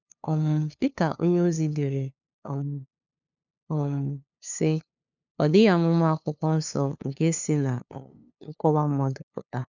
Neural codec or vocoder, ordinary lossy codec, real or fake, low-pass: codec, 16 kHz, 2 kbps, FunCodec, trained on LibriTTS, 25 frames a second; none; fake; 7.2 kHz